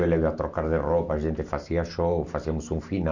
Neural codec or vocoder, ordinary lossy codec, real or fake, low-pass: none; none; real; 7.2 kHz